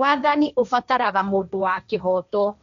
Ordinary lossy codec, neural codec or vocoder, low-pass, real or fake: none; codec, 16 kHz, 1.1 kbps, Voila-Tokenizer; 7.2 kHz; fake